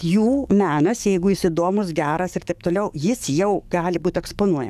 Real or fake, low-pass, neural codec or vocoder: fake; 14.4 kHz; codec, 44.1 kHz, 7.8 kbps, DAC